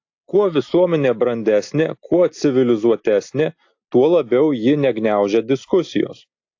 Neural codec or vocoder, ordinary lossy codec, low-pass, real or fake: none; AAC, 48 kbps; 7.2 kHz; real